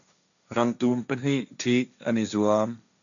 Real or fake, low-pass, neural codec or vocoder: fake; 7.2 kHz; codec, 16 kHz, 1.1 kbps, Voila-Tokenizer